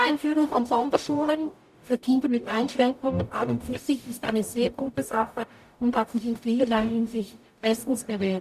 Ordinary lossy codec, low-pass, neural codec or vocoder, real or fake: none; 14.4 kHz; codec, 44.1 kHz, 0.9 kbps, DAC; fake